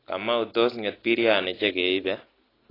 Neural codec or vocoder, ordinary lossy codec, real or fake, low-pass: none; AAC, 24 kbps; real; 5.4 kHz